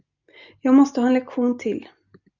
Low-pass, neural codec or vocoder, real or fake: 7.2 kHz; none; real